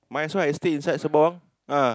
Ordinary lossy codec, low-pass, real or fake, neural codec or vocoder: none; none; real; none